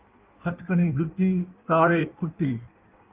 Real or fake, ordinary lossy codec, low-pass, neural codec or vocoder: fake; Opus, 32 kbps; 3.6 kHz; codec, 16 kHz in and 24 kHz out, 1.1 kbps, FireRedTTS-2 codec